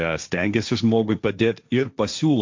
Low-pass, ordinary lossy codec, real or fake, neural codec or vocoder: 7.2 kHz; MP3, 64 kbps; fake; codec, 16 kHz, 1.1 kbps, Voila-Tokenizer